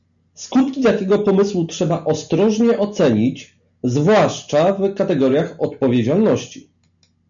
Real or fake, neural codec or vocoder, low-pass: real; none; 7.2 kHz